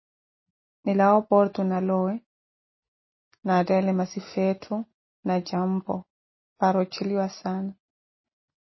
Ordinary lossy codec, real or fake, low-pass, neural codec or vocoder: MP3, 24 kbps; real; 7.2 kHz; none